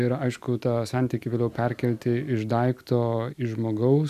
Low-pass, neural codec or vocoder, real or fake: 14.4 kHz; none; real